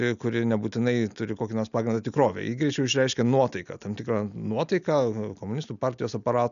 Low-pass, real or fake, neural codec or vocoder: 7.2 kHz; real; none